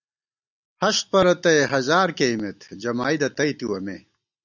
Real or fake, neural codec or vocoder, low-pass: real; none; 7.2 kHz